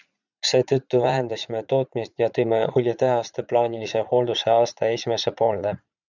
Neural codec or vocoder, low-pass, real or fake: vocoder, 44.1 kHz, 80 mel bands, Vocos; 7.2 kHz; fake